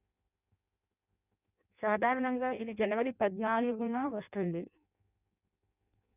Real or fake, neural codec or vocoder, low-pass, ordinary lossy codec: fake; codec, 16 kHz in and 24 kHz out, 0.6 kbps, FireRedTTS-2 codec; 3.6 kHz; none